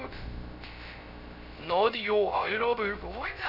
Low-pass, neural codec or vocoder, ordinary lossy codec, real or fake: 5.4 kHz; codec, 16 kHz, 0.3 kbps, FocalCodec; none; fake